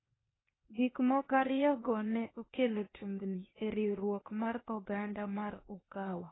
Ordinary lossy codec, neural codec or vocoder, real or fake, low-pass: AAC, 16 kbps; codec, 16 kHz, 0.8 kbps, ZipCodec; fake; 7.2 kHz